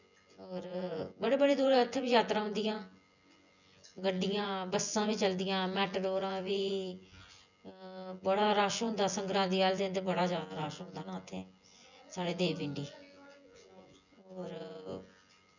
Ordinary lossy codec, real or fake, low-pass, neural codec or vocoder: none; fake; 7.2 kHz; vocoder, 24 kHz, 100 mel bands, Vocos